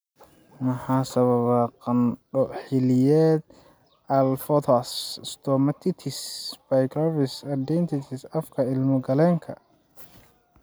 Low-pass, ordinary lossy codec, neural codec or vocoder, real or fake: none; none; none; real